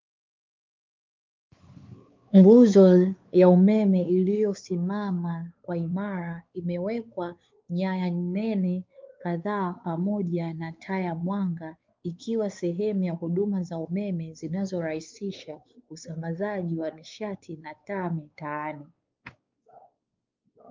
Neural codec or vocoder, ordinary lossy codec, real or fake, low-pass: codec, 16 kHz, 4 kbps, X-Codec, WavLM features, trained on Multilingual LibriSpeech; Opus, 24 kbps; fake; 7.2 kHz